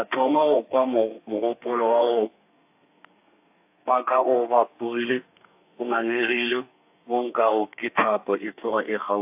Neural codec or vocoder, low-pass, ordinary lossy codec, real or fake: codec, 32 kHz, 1.9 kbps, SNAC; 3.6 kHz; AAC, 32 kbps; fake